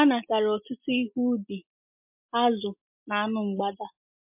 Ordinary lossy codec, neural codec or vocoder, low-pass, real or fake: MP3, 32 kbps; none; 3.6 kHz; real